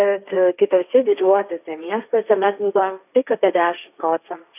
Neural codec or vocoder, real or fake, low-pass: codec, 16 kHz, 1.1 kbps, Voila-Tokenizer; fake; 3.6 kHz